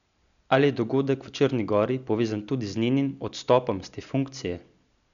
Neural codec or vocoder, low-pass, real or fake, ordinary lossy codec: none; 7.2 kHz; real; none